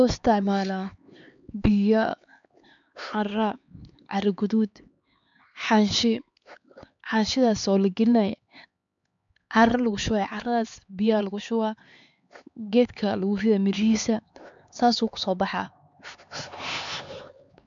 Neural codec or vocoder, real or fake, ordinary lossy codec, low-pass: codec, 16 kHz, 4 kbps, X-Codec, HuBERT features, trained on LibriSpeech; fake; MP3, 64 kbps; 7.2 kHz